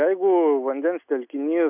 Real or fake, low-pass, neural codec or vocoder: real; 3.6 kHz; none